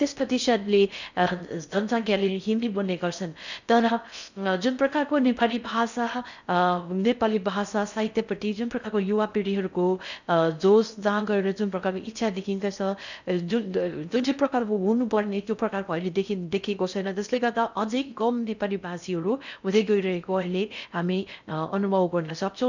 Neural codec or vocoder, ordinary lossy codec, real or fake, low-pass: codec, 16 kHz in and 24 kHz out, 0.6 kbps, FocalCodec, streaming, 4096 codes; none; fake; 7.2 kHz